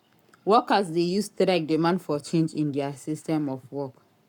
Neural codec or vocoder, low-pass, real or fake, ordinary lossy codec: codec, 44.1 kHz, 7.8 kbps, Pupu-Codec; 19.8 kHz; fake; none